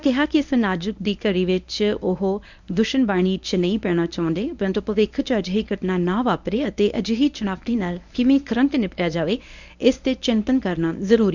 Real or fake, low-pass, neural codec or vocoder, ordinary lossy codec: fake; 7.2 kHz; codec, 24 kHz, 0.9 kbps, WavTokenizer, medium speech release version 1; none